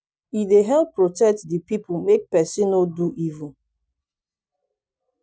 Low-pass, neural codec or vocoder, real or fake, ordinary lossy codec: none; none; real; none